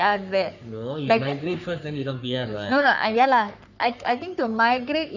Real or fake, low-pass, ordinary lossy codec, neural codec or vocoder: fake; 7.2 kHz; none; codec, 44.1 kHz, 3.4 kbps, Pupu-Codec